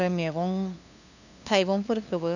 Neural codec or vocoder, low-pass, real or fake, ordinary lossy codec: codec, 16 kHz, 2 kbps, FunCodec, trained on LibriTTS, 25 frames a second; 7.2 kHz; fake; none